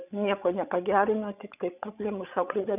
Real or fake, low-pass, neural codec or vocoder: fake; 3.6 kHz; codec, 16 kHz, 16 kbps, FreqCodec, larger model